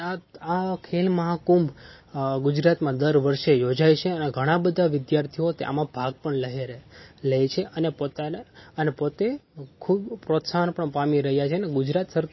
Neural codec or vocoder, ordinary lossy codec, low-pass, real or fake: none; MP3, 24 kbps; 7.2 kHz; real